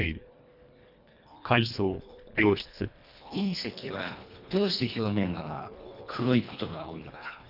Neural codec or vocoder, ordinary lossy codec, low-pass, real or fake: codec, 24 kHz, 1.5 kbps, HILCodec; none; 5.4 kHz; fake